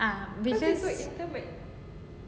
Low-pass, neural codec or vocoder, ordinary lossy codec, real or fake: none; none; none; real